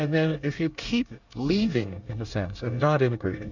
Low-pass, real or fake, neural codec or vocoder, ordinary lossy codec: 7.2 kHz; fake; codec, 24 kHz, 1 kbps, SNAC; Opus, 64 kbps